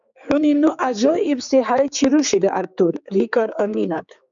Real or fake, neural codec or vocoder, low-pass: fake; codec, 16 kHz, 4 kbps, X-Codec, HuBERT features, trained on general audio; 7.2 kHz